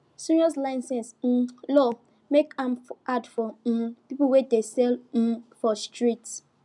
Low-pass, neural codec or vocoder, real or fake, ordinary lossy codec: 10.8 kHz; none; real; none